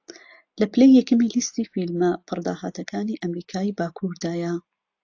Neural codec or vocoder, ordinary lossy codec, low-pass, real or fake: none; AAC, 48 kbps; 7.2 kHz; real